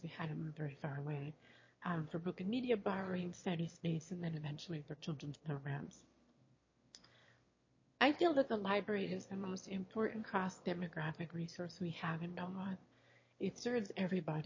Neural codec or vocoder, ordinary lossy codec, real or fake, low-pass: autoencoder, 22.05 kHz, a latent of 192 numbers a frame, VITS, trained on one speaker; MP3, 32 kbps; fake; 7.2 kHz